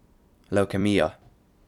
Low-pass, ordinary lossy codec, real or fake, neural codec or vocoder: 19.8 kHz; none; fake; vocoder, 44.1 kHz, 128 mel bands every 256 samples, BigVGAN v2